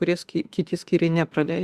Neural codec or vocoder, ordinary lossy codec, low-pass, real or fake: autoencoder, 48 kHz, 32 numbers a frame, DAC-VAE, trained on Japanese speech; Opus, 64 kbps; 14.4 kHz; fake